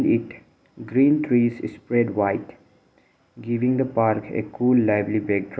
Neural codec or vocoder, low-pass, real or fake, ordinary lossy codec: none; none; real; none